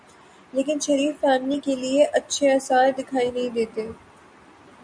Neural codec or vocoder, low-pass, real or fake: none; 9.9 kHz; real